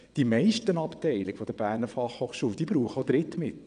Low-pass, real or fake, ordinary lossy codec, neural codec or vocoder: 9.9 kHz; fake; none; vocoder, 22.05 kHz, 80 mel bands, WaveNeXt